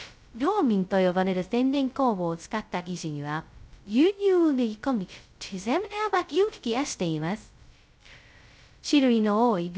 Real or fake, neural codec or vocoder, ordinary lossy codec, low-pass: fake; codec, 16 kHz, 0.2 kbps, FocalCodec; none; none